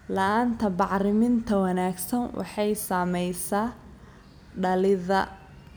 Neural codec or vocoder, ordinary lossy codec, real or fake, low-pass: none; none; real; none